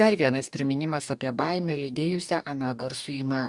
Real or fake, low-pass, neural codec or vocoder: fake; 10.8 kHz; codec, 44.1 kHz, 2.6 kbps, DAC